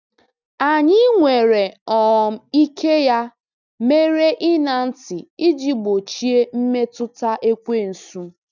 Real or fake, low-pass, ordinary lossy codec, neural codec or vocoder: real; 7.2 kHz; none; none